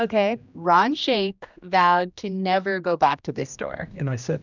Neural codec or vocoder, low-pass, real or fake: codec, 16 kHz, 1 kbps, X-Codec, HuBERT features, trained on general audio; 7.2 kHz; fake